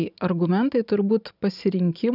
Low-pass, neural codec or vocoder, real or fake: 5.4 kHz; none; real